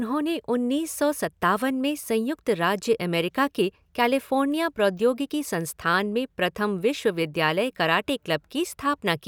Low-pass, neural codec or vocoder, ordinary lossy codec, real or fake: none; none; none; real